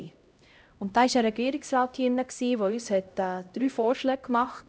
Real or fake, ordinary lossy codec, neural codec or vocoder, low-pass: fake; none; codec, 16 kHz, 0.5 kbps, X-Codec, HuBERT features, trained on LibriSpeech; none